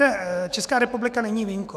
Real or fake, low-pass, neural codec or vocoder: fake; 14.4 kHz; autoencoder, 48 kHz, 128 numbers a frame, DAC-VAE, trained on Japanese speech